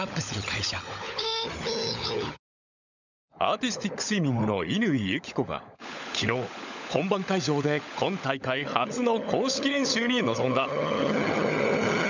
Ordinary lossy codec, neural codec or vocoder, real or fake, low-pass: none; codec, 16 kHz, 16 kbps, FunCodec, trained on LibriTTS, 50 frames a second; fake; 7.2 kHz